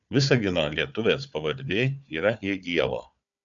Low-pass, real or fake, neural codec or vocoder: 7.2 kHz; fake; codec, 16 kHz, 4 kbps, FunCodec, trained on Chinese and English, 50 frames a second